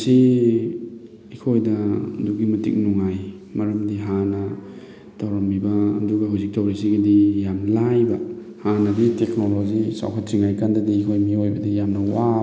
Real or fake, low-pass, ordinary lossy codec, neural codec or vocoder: real; none; none; none